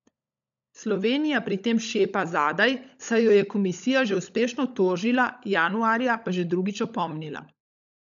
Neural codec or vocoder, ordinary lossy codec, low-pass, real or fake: codec, 16 kHz, 16 kbps, FunCodec, trained on LibriTTS, 50 frames a second; none; 7.2 kHz; fake